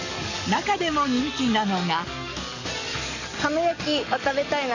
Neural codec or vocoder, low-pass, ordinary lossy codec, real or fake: codec, 44.1 kHz, 7.8 kbps, DAC; 7.2 kHz; none; fake